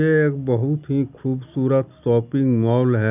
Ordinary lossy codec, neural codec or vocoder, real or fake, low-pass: none; none; real; 3.6 kHz